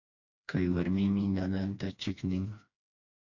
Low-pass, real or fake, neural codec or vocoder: 7.2 kHz; fake; codec, 16 kHz, 2 kbps, FreqCodec, smaller model